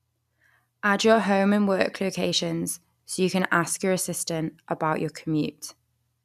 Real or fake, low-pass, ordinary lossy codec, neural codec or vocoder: real; 14.4 kHz; none; none